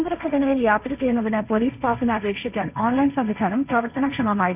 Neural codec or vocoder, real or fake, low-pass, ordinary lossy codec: codec, 16 kHz, 1.1 kbps, Voila-Tokenizer; fake; 3.6 kHz; none